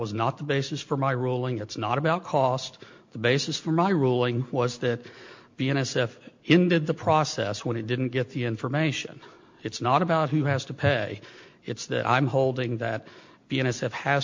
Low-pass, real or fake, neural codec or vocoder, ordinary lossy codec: 7.2 kHz; real; none; MP3, 48 kbps